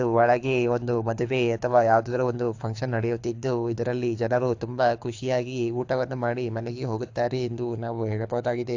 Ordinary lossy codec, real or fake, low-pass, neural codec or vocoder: AAC, 48 kbps; fake; 7.2 kHz; codec, 24 kHz, 6 kbps, HILCodec